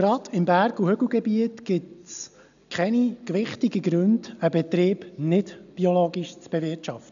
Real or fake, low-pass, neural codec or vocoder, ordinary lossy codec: real; 7.2 kHz; none; MP3, 96 kbps